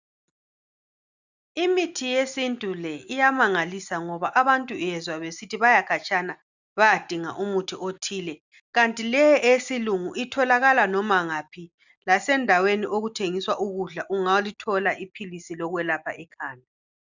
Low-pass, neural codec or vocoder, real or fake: 7.2 kHz; none; real